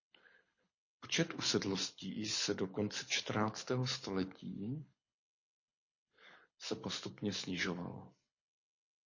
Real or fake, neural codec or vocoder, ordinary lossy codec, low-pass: fake; codec, 24 kHz, 6 kbps, HILCodec; MP3, 32 kbps; 7.2 kHz